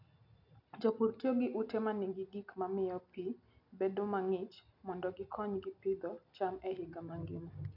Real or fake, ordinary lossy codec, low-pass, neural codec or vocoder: real; none; 5.4 kHz; none